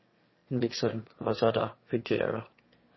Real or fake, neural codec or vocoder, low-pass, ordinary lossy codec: fake; autoencoder, 22.05 kHz, a latent of 192 numbers a frame, VITS, trained on one speaker; 7.2 kHz; MP3, 24 kbps